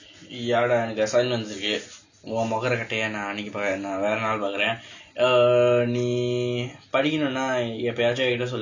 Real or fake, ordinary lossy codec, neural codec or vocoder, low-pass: real; none; none; 7.2 kHz